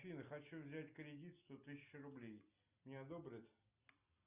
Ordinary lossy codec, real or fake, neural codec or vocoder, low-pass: Opus, 64 kbps; real; none; 3.6 kHz